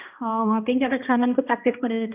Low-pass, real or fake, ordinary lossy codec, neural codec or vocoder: 3.6 kHz; fake; none; codec, 16 kHz, 1 kbps, X-Codec, HuBERT features, trained on balanced general audio